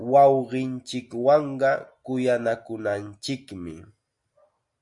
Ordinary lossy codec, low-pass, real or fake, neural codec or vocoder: MP3, 96 kbps; 10.8 kHz; real; none